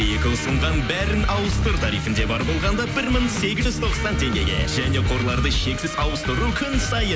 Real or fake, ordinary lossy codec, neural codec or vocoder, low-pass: real; none; none; none